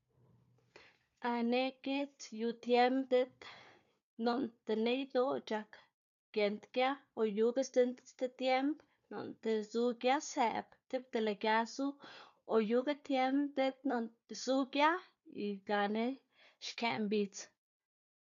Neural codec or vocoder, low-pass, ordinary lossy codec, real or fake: codec, 16 kHz, 4 kbps, FunCodec, trained on Chinese and English, 50 frames a second; 7.2 kHz; none; fake